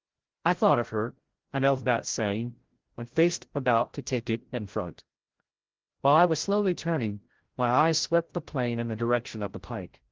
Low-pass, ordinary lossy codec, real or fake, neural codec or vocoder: 7.2 kHz; Opus, 16 kbps; fake; codec, 16 kHz, 0.5 kbps, FreqCodec, larger model